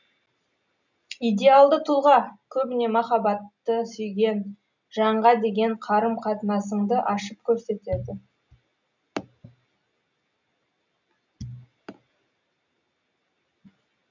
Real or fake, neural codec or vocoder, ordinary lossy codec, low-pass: real; none; none; 7.2 kHz